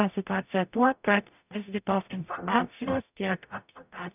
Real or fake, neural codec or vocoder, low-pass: fake; codec, 44.1 kHz, 0.9 kbps, DAC; 3.6 kHz